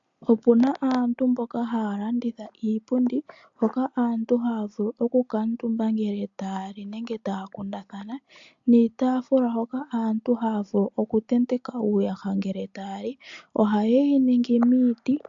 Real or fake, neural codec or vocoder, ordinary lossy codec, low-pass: real; none; AAC, 64 kbps; 7.2 kHz